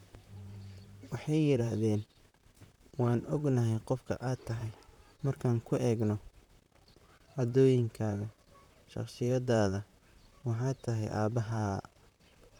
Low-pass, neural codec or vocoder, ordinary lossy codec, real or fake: 19.8 kHz; vocoder, 44.1 kHz, 128 mel bands, Pupu-Vocoder; none; fake